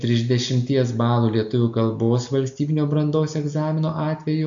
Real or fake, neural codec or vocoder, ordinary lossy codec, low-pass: real; none; AAC, 64 kbps; 7.2 kHz